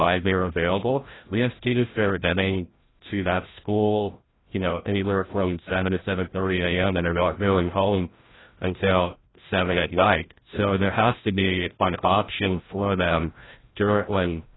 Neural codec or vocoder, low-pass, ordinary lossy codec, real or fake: codec, 16 kHz, 0.5 kbps, FreqCodec, larger model; 7.2 kHz; AAC, 16 kbps; fake